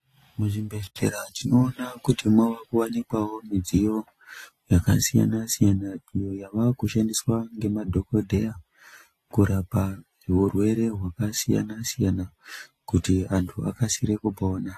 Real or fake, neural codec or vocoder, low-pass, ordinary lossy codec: real; none; 14.4 kHz; AAC, 48 kbps